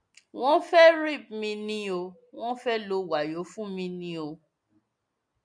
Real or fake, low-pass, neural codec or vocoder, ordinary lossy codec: real; 9.9 kHz; none; MP3, 64 kbps